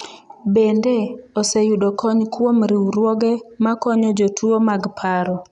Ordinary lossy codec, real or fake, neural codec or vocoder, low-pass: none; real; none; 10.8 kHz